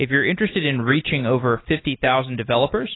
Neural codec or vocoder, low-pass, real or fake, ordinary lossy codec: none; 7.2 kHz; real; AAC, 16 kbps